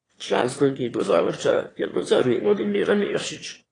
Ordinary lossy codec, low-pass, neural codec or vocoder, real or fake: AAC, 32 kbps; 9.9 kHz; autoencoder, 22.05 kHz, a latent of 192 numbers a frame, VITS, trained on one speaker; fake